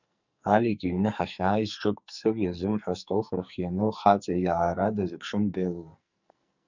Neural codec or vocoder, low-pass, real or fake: codec, 44.1 kHz, 2.6 kbps, SNAC; 7.2 kHz; fake